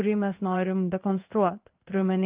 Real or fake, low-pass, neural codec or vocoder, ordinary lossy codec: fake; 3.6 kHz; codec, 16 kHz in and 24 kHz out, 1 kbps, XY-Tokenizer; Opus, 24 kbps